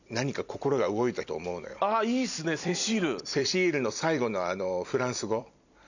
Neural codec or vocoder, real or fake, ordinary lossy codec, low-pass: vocoder, 44.1 kHz, 128 mel bands every 256 samples, BigVGAN v2; fake; none; 7.2 kHz